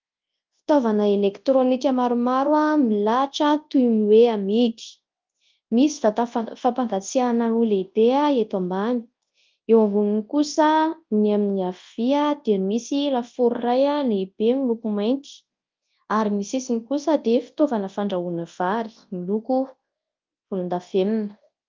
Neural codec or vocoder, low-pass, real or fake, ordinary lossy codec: codec, 24 kHz, 0.9 kbps, WavTokenizer, large speech release; 7.2 kHz; fake; Opus, 24 kbps